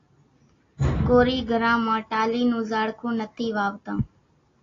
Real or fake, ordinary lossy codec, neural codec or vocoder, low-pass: real; AAC, 32 kbps; none; 7.2 kHz